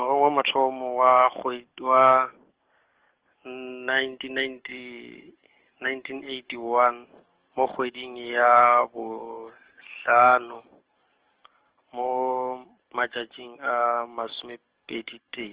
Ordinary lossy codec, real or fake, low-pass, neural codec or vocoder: Opus, 16 kbps; fake; 3.6 kHz; codec, 44.1 kHz, 7.8 kbps, DAC